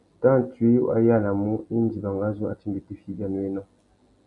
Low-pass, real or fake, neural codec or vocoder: 10.8 kHz; real; none